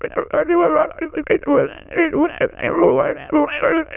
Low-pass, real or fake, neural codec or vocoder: 3.6 kHz; fake; autoencoder, 22.05 kHz, a latent of 192 numbers a frame, VITS, trained on many speakers